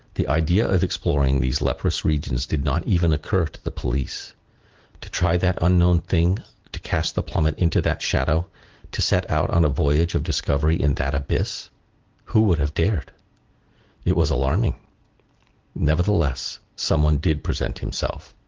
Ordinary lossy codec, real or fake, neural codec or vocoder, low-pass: Opus, 16 kbps; real; none; 7.2 kHz